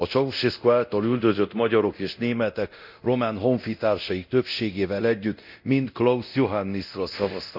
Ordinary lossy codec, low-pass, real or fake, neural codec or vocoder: none; 5.4 kHz; fake; codec, 24 kHz, 0.9 kbps, DualCodec